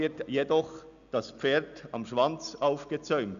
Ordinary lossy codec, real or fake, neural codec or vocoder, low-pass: MP3, 96 kbps; real; none; 7.2 kHz